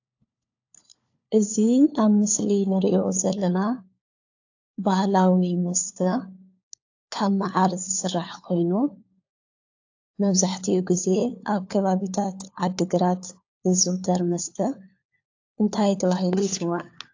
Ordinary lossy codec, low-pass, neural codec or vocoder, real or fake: AAC, 48 kbps; 7.2 kHz; codec, 16 kHz, 4 kbps, FunCodec, trained on LibriTTS, 50 frames a second; fake